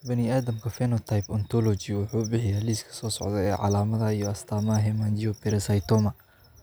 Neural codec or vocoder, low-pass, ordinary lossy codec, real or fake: none; none; none; real